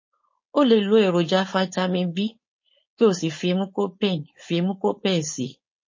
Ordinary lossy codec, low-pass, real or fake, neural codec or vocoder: MP3, 32 kbps; 7.2 kHz; fake; codec, 16 kHz, 4.8 kbps, FACodec